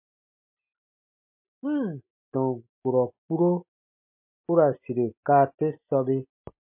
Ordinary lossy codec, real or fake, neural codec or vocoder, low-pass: MP3, 32 kbps; real; none; 3.6 kHz